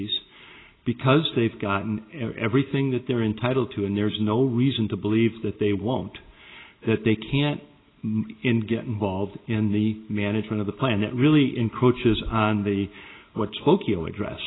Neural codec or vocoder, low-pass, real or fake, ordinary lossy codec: none; 7.2 kHz; real; AAC, 16 kbps